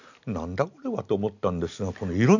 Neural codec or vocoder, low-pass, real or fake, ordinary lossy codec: none; 7.2 kHz; real; none